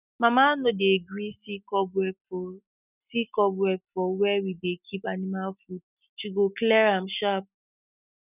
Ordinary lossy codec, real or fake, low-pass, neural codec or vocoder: none; real; 3.6 kHz; none